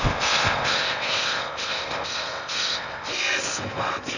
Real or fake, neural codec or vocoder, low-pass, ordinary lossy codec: fake; codec, 16 kHz in and 24 kHz out, 0.6 kbps, FocalCodec, streaming, 4096 codes; 7.2 kHz; none